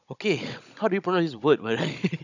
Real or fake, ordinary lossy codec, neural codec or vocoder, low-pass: fake; none; codec, 16 kHz, 16 kbps, FunCodec, trained on Chinese and English, 50 frames a second; 7.2 kHz